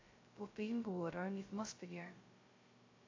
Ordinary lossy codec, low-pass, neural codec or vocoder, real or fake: AAC, 32 kbps; 7.2 kHz; codec, 16 kHz, 0.2 kbps, FocalCodec; fake